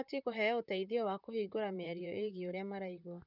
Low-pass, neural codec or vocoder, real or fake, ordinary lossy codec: 5.4 kHz; vocoder, 44.1 kHz, 128 mel bands, Pupu-Vocoder; fake; none